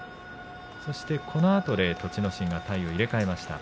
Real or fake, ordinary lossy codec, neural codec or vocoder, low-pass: real; none; none; none